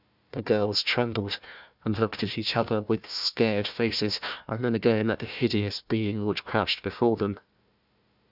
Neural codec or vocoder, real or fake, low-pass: codec, 16 kHz, 1 kbps, FunCodec, trained on Chinese and English, 50 frames a second; fake; 5.4 kHz